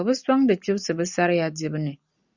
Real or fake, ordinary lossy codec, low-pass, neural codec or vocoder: real; Opus, 64 kbps; 7.2 kHz; none